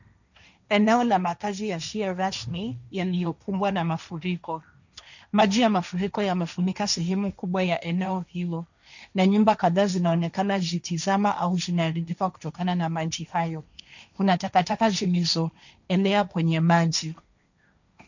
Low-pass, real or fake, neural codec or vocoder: 7.2 kHz; fake; codec, 16 kHz, 1.1 kbps, Voila-Tokenizer